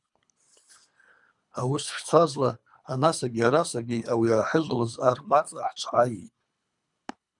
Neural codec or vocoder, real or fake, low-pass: codec, 24 kHz, 3 kbps, HILCodec; fake; 10.8 kHz